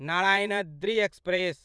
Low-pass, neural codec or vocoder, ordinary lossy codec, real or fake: none; vocoder, 22.05 kHz, 80 mel bands, Vocos; none; fake